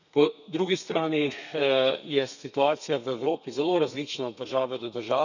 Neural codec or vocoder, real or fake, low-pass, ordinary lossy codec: codec, 32 kHz, 1.9 kbps, SNAC; fake; 7.2 kHz; none